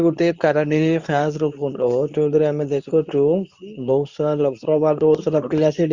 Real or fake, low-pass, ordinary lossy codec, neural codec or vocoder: fake; 7.2 kHz; Opus, 64 kbps; codec, 24 kHz, 0.9 kbps, WavTokenizer, medium speech release version 2